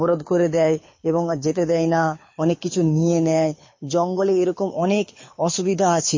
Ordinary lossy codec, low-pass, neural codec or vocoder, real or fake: MP3, 32 kbps; 7.2 kHz; codec, 24 kHz, 6 kbps, HILCodec; fake